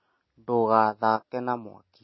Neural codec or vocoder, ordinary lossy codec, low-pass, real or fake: none; MP3, 24 kbps; 7.2 kHz; real